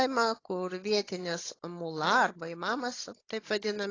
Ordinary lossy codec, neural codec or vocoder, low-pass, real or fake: AAC, 32 kbps; codec, 16 kHz, 16 kbps, FunCodec, trained on Chinese and English, 50 frames a second; 7.2 kHz; fake